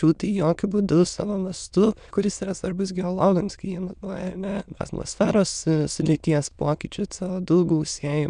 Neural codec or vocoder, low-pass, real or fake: autoencoder, 22.05 kHz, a latent of 192 numbers a frame, VITS, trained on many speakers; 9.9 kHz; fake